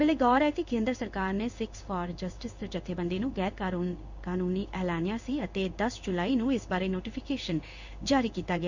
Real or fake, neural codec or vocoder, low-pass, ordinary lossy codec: fake; codec, 16 kHz in and 24 kHz out, 1 kbps, XY-Tokenizer; 7.2 kHz; none